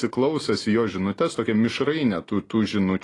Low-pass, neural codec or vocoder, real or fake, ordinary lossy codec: 10.8 kHz; none; real; AAC, 32 kbps